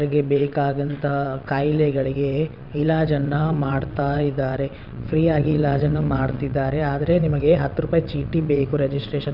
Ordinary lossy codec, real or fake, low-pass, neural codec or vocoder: none; fake; 5.4 kHz; vocoder, 22.05 kHz, 80 mel bands, WaveNeXt